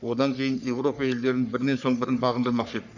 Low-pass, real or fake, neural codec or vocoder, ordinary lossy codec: 7.2 kHz; fake; codec, 44.1 kHz, 3.4 kbps, Pupu-Codec; none